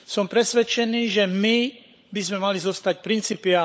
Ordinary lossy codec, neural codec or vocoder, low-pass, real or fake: none; codec, 16 kHz, 16 kbps, FunCodec, trained on LibriTTS, 50 frames a second; none; fake